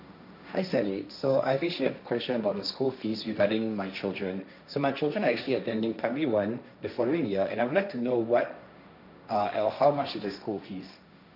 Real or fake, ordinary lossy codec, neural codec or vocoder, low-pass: fake; none; codec, 16 kHz, 1.1 kbps, Voila-Tokenizer; 5.4 kHz